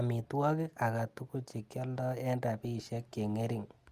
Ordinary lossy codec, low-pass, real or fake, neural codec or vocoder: Opus, 32 kbps; 14.4 kHz; real; none